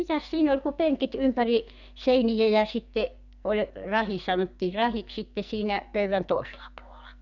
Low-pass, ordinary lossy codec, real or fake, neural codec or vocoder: 7.2 kHz; none; fake; codec, 44.1 kHz, 2.6 kbps, SNAC